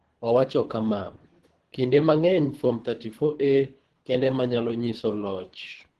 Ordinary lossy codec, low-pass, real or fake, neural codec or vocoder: Opus, 16 kbps; 10.8 kHz; fake; codec, 24 kHz, 3 kbps, HILCodec